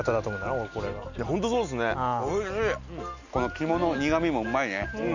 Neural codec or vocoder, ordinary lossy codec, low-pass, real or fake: none; none; 7.2 kHz; real